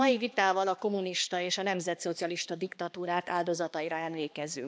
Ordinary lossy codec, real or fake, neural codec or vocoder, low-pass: none; fake; codec, 16 kHz, 2 kbps, X-Codec, HuBERT features, trained on balanced general audio; none